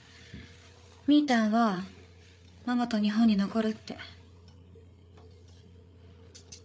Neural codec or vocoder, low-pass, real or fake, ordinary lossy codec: codec, 16 kHz, 8 kbps, FreqCodec, larger model; none; fake; none